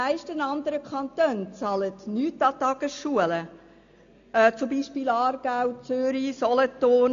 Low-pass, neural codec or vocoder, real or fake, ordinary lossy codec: 7.2 kHz; none; real; AAC, 64 kbps